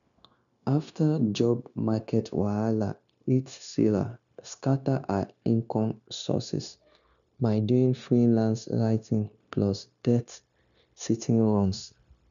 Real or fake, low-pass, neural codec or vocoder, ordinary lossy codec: fake; 7.2 kHz; codec, 16 kHz, 0.9 kbps, LongCat-Audio-Codec; none